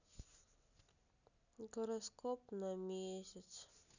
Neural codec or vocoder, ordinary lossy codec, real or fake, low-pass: none; none; real; 7.2 kHz